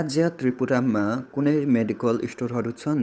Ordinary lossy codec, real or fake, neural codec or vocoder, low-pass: none; fake; codec, 16 kHz, 8 kbps, FunCodec, trained on Chinese and English, 25 frames a second; none